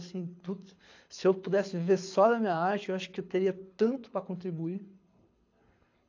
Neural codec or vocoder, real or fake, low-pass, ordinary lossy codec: codec, 24 kHz, 6 kbps, HILCodec; fake; 7.2 kHz; AAC, 48 kbps